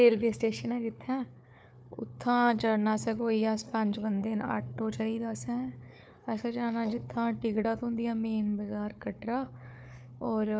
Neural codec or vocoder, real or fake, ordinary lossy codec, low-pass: codec, 16 kHz, 4 kbps, FunCodec, trained on Chinese and English, 50 frames a second; fake; none; none